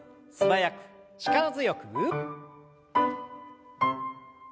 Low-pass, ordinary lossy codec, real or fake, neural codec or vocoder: none; none; real; none